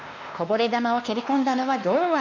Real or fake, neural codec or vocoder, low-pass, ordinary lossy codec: fake; codec, 16 kHz, 2 kbps, X-Codec, WavLM features, trained on Multilingual LibriSpeech; 7.2 kHz; none